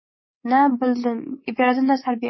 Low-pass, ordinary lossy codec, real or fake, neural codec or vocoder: 7.2 kHz; MP3, 24 kbps; fake; codec, 24 kHz, 3.1 kbps, DualCodec